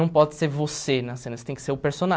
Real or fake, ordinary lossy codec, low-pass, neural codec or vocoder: real; none; none; none